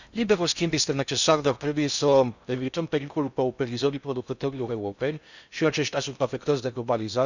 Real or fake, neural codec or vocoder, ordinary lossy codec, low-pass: fake; codec, 16 kHz in and 24 kHz out, 0.6 kbps, FocalCodec, streaming, 4096 codes; none; 7.2 kHz